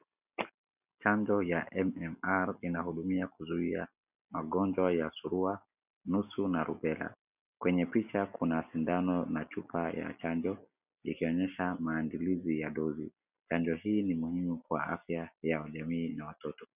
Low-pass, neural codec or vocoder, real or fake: 3.6 kHz; none; real